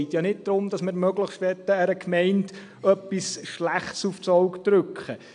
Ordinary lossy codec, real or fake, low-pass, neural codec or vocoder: AAC, 64 kbps; real; 9.9 kHz; none